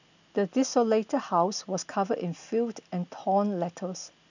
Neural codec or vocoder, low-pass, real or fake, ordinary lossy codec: none; 7.2 kHz; real; none